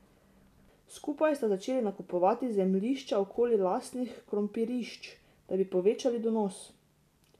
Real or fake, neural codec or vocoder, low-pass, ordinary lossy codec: real; none; 14.4 kHz; none